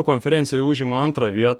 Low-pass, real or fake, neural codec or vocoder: 19.8 kHz; fake; codec, 44.1 kHz, 2.6 kbps, DAC